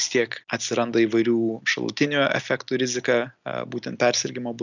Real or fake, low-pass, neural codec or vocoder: real; 7.2 kHz; none